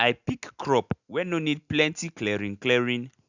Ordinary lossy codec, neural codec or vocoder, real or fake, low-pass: none; none; real; 7.2 kHz